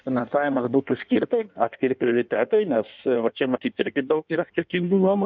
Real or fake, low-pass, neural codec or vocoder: fake; 7.2 kHz; codec, 16 kHz in and 24 kHz out, 1.1 kbps, FireRedTTS-2 codec